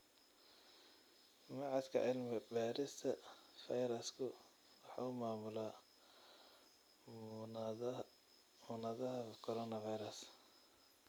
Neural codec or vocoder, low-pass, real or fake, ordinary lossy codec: none; none; real; none